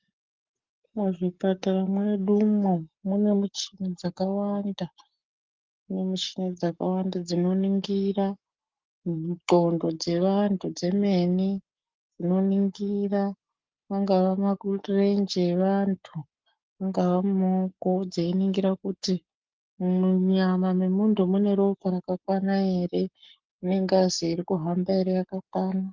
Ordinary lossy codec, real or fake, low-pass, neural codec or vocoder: Opus, 32 kbps; fake; 7.2 kHz; codec, 44.1 kHz, 7.8 kbps, Pupu-Codec